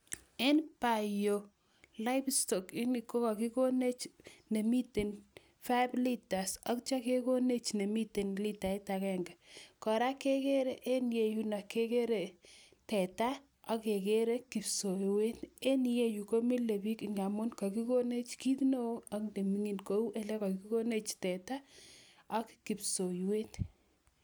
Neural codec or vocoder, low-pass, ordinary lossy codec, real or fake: none; none; none; real